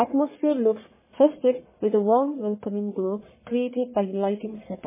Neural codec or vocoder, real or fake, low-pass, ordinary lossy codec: codec, 44.1 kHz, 1.7 kbps, Pupu-Codec; fake; 3.6 kHz; MP3, 16 kbps